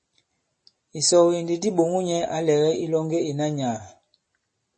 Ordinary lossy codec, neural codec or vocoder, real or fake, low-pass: MP3, 32 kbps; none; real; 10.8 kHz